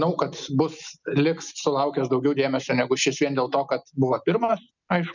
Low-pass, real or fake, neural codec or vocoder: 7.2 kHz; real; none